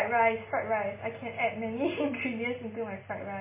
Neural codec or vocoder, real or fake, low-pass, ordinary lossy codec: none; real; 3.6 kHz; MP3, 16 kbps